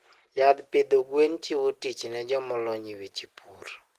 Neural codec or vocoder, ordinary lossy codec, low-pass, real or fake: none; Opus, 16 kbps; 14.4 kHz; real